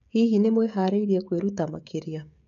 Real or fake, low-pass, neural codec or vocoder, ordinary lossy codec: fake; 7.2 kHz; codec, 16 kHz, 16 kbps, FreqCodec, smaller model; MP3, 64 kbps